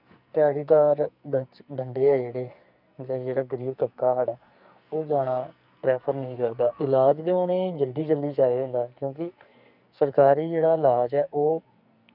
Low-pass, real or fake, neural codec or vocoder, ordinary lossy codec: 5.4 kHz; fake; codec, 44.1 kHz, 2.6 kbps, SNAC; none